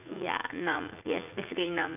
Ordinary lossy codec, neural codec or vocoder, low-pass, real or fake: none; vocoder, 44.1 kHz, 80 mel bands, Vocos; 3.6 kHz; fake